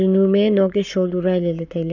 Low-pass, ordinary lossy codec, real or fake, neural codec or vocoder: 7.2 kHz; none; fake; codec, 44.1 kHz, 7.8 kbps, Pupu-Codec